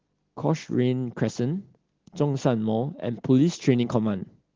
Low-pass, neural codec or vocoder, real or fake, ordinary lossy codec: 7.2 kHz; none; real; Opus, 16 kbps